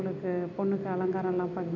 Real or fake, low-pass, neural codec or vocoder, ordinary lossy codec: real; 7.2 kHz; none; none